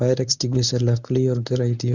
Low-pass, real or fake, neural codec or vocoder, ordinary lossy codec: 7.2 kHz; fake; codec, 24 kHz, 0.9 kbps, WavTokenizer, medium speech release version 1; none